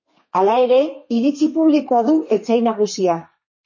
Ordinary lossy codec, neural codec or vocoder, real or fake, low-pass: MP3, 32 kbps; codec, 32 kHz, 1.9 kbps, SNAC; fake; 7.2 kHz